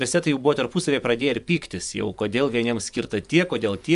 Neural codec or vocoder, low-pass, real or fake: vocoder, 24 kHz, 100 mel bands, Vocos; 10.8 kHz; fake